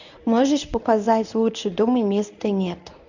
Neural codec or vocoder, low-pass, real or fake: codec, 24 kHz, 0.9 kbps, WavTokenizer, medium speech release version 1; 7.2 kHz; fake